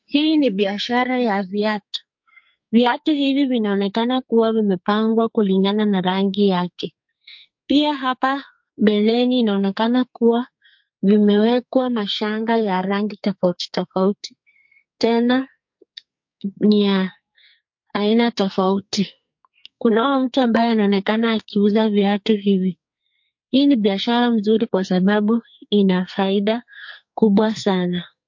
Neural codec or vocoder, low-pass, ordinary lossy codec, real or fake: codec, 44.1 kHz, 2.6 kbps, SNAC; 7.2 kHz; MP3, 48 kbps; fake